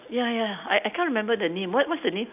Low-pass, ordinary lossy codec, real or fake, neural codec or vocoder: 3.6 kHz; none; real; none